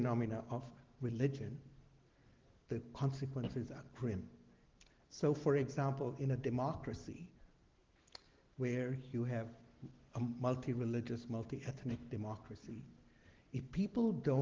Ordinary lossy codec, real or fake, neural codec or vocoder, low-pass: Opus, 16 kbps; real; none; 7.2 kHz